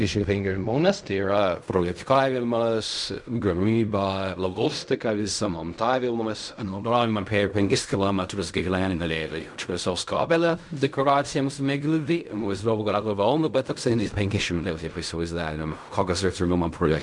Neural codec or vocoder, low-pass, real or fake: codec, 16 kHz in and 24 kHz out, 0.4 kbps, LongCat-Audio-Codec, fine tuned four codebook decoder; 10.8 kHz; fake